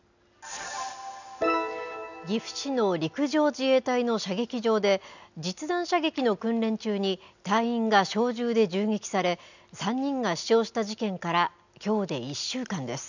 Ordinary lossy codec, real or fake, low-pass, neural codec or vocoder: none; real; 7.2 kHz; none